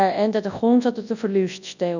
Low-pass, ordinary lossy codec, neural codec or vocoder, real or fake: 7.2 kHz; none; codec, 24 kHz, 0.9 kbps, WavTokenizer, large speech release; fake